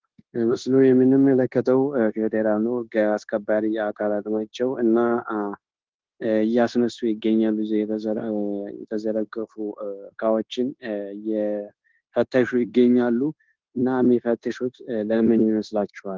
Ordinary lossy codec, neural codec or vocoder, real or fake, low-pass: Opus, 16 kbps; codec, 16 kHz, 0.9 kbps, LongCat-Audio-Codec; fake; 7.2 kHz